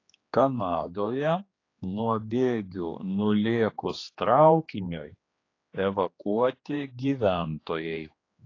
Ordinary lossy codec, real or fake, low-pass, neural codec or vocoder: AAC, 32 kbps; fake; 7.2 kHz; codec, 16 kHz, 2 kbps, X-Codec, HuBERT features, trained on general audio